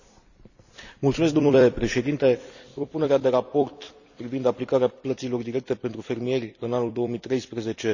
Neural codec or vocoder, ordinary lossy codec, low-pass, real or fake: vocoder, 44.1 kHz, 128 mel bands every 256 samples, BigVGAN v2; none; 7.2 kHz; fake